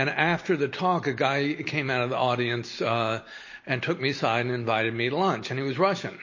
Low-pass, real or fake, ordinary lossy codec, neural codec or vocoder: 7.2 kHz; real; MP3, 32 kbps; none